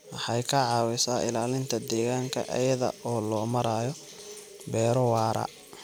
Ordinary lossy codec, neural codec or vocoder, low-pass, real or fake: none; vocoder, 44.1 kHz, 128 mel bands every 256 samples, BigVGAN v2; none; fake